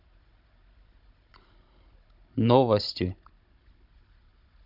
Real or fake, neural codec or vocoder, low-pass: fake; codec, 16 kHz, 16 kbps, FunCodec, trained on Chinese and English, 50 frames a second; 5.4 kHz